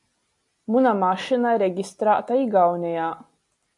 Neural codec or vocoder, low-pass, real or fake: none; 10.8 kHz; real